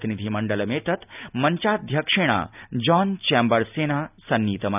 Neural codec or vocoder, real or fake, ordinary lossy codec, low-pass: none; real; none; 3.6 kHz